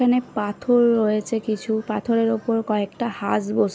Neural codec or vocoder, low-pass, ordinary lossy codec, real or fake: none; none; none; real